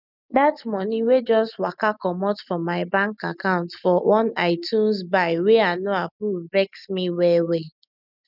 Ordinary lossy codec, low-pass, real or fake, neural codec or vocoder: none; 5.4 kHz; real; none